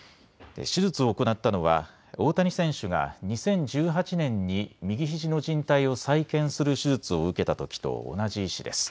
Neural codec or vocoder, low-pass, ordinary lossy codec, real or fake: none; none; none; real